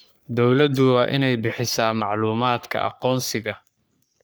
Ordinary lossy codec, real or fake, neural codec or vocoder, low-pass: none; fake; codec, 44.1 kHz, 3.4 kbps, Pupu-Codec; none